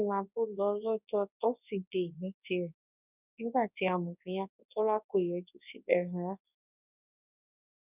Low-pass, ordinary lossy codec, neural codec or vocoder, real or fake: 3.6 kHz; none; codec, 24 kHz, 0.9 kbps, WavTokenizer, large speech release; fake